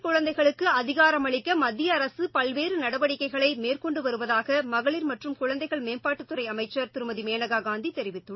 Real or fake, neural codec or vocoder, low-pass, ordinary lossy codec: real; none; 7.2 kHz; MP3, 24 kbps